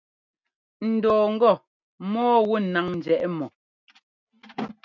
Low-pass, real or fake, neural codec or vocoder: 7.2 kHz; real; none